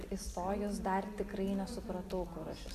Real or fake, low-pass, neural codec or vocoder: real; 14.4 kHz; none